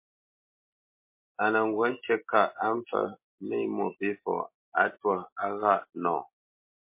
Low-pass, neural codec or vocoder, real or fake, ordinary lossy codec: 3.6 kHz; none; real; MP3, 24 kbps